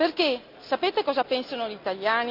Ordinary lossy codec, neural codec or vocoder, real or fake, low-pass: none; none; real; 5.4 kHz